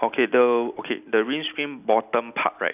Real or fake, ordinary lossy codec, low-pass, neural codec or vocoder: real; none; 3.6 kHz; none